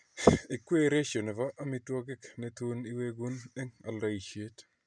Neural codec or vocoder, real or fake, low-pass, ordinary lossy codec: none; real; 9.9 kHz; none